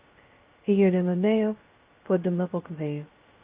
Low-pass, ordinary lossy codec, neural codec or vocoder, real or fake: 3.6 kHz; Opus, 32 kbps; codec, 16 kHz, 0.2 kbps, FocalCodec; fake